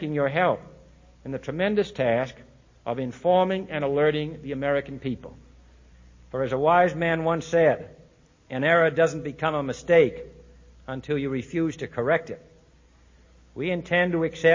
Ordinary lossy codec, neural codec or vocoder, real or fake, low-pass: MP3, 32 kbps; none; real; 7.2 kHz